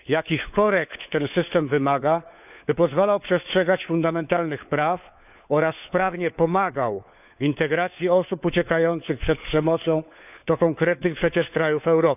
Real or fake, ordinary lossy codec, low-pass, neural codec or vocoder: fake; none; 3.6 kHz; codec, 16 kHz, 4 kbps, FunCodec, trained on LibriTTS, 50 frames a second